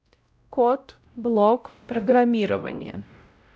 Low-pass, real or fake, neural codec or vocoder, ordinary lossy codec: none; fake; codec, 16 kHz, 0.5 kbps, X-Codec, WavLM features, trained on Multilingual LibriSpeech; none